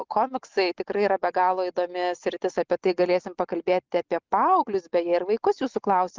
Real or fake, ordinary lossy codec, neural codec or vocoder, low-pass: real; Opus, 24 kbps; none; 7.2 kHz